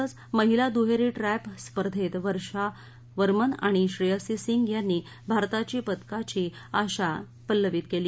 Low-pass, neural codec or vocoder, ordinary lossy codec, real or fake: none; none; none; real